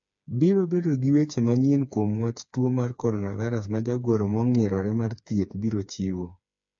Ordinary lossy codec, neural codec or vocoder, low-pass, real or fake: MP3, 48 kbps; codec, 16 kHz, 4 kbps, FreqCodec, smaller model; 7.2 kHz; fake